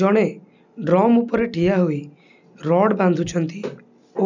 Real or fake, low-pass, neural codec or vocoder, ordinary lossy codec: real; 7.2 kHz; none; none